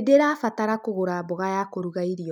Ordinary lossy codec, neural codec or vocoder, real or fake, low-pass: none; none; real; 19.8 kHz